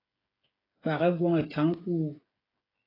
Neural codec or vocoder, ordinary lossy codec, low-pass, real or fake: codec, 16 kHz, 8 kbps, FreqCodec, smaller model; AAC, 24 kbps; 5.4 kHz; fake